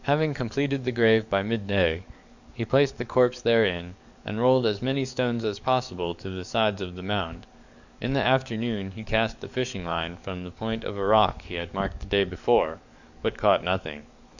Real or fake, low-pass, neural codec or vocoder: fake; 7.2 kHz; codec, 44.1 kHz, 7.8 kbps, DAC